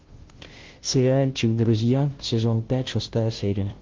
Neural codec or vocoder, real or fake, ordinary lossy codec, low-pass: codec, 16 kHz, 0.5 kbps, FunCodec, trained on Chinese and English, 25 frames a second; fake; Opus, 16 kbps; 7.2 kHz